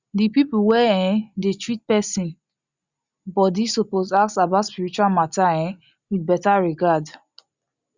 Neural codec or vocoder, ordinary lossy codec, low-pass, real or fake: none; Opus, 64 kbps; 7.2 kHz; real